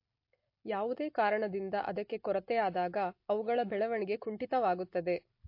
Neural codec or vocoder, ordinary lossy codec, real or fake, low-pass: none; MP3, 32 kbps; real; 5.4 kHz